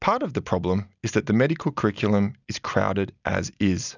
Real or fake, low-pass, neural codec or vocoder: real; 7.2 kHz; none